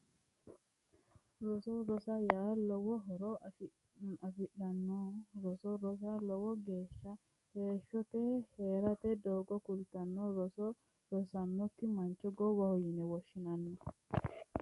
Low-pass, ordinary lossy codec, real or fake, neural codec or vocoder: 14.4 kHz; MP3, 48 kbps; fake; codec, 44.1 kHz, 7.8 kbps, DAC